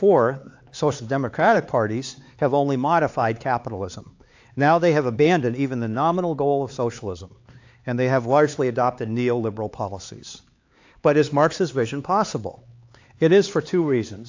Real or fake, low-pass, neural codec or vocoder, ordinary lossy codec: fake; 7.2 kHz; codec, 16 kHz, 4 kbps, X-Codec, HuBERT features, trained on LibriSpeech; AAC, 48 kbps